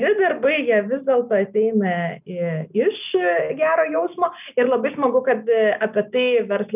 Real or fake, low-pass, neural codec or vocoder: real; 3.6 kHz; none